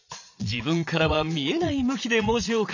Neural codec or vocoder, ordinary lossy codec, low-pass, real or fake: vocoder, 22.05 kHz, 80 mel bands, Vocos; none; 7.2 kHz; fake